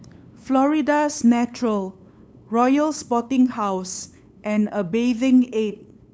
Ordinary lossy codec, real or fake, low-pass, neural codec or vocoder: none; fake; none; codec, 16 kHz, 8 kbps, FunCodec, trained on LibriTTS, 25 frames a second